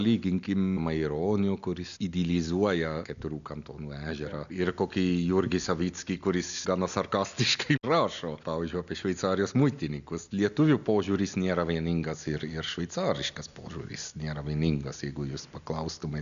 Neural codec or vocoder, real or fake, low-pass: none; real; 7.2 kHz